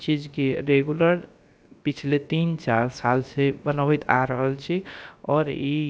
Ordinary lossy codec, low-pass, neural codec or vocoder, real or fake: none; none; codec, 16 kHz, about 1 kbps, DyCAST, with the encoder's durations; fake